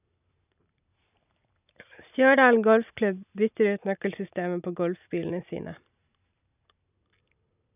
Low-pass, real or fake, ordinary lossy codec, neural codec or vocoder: 3.6 kHz; real; none; none